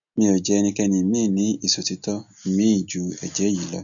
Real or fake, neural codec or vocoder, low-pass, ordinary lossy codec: real; none; 7.2 kHz; none